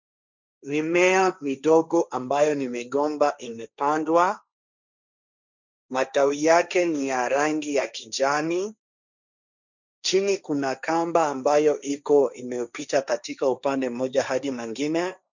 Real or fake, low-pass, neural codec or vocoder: fake; 7.2 kHz; codec, 16 kHz, 1.1 kbps, Voila-Tokenizer